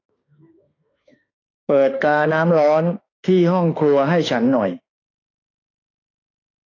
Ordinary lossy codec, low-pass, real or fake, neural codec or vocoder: AAC, 32 kbps; 7.2 kHz; fake; autoencoder, 48 kHz, 32 numbers a frame, DAC-VAE, trained on Japanese speech